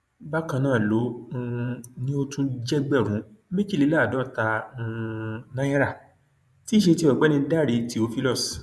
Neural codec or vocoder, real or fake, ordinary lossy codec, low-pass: vocoder, 24 kHz, 100 mel bands, Vocos; fake; none; none